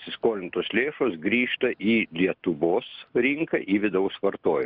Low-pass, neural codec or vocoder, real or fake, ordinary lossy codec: 5.4 kHz; none; real; Opus, 64 kbps